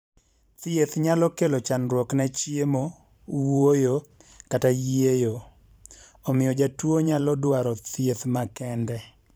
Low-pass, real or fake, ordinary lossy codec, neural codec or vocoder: none; fake; none; vocoder, 44.1 kHz, 128 mel bands every 512 samples, BigVGAN v2